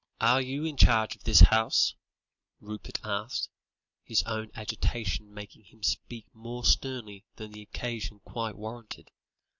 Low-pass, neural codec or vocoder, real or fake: 7.2 kHz; none; real